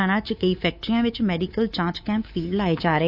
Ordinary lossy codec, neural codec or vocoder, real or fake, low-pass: AAC, 48 kbps; codec, 24 kHz, 3.1 kbps, DualCodec; fake; 5.4 kHz